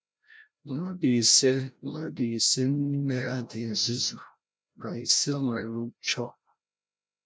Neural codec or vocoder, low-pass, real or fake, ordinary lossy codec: codec, 16 kHz, 0.5 kbps, FreqCodec, larger model; none; fake; none